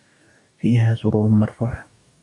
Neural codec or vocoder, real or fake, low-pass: codec, 44.1 kHz, 2.6 kbps, DAC; fake; 10.8 kHz